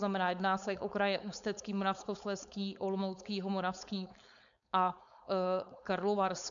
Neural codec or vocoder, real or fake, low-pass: codec, 16 kHz, 4.8 kbps, FACodec; fake; 7.2 kHz